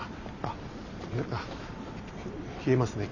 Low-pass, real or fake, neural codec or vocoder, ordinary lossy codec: 7.2 kHz; real; none; none